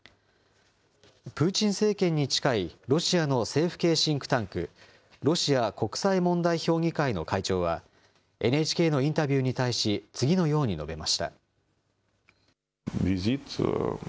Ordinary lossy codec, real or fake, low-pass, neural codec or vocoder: none; real; none; none